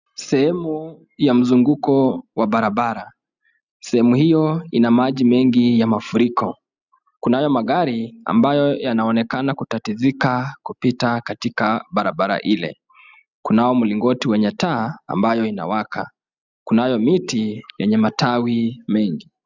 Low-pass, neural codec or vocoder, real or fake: 7.2 kHz; none; real